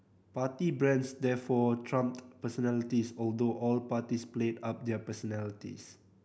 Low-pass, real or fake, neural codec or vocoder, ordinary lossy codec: none; real; none; none